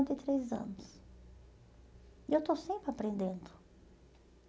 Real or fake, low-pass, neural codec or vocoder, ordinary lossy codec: real; none; none; none